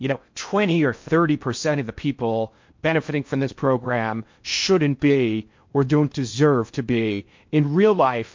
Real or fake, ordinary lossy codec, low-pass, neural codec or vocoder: fake; MP3, 48 kbps; 7.2 kHz; codec, 16 kHz in and 24 kHz out, 0.6 kbps, FocalCodec, streaming, 2048 codes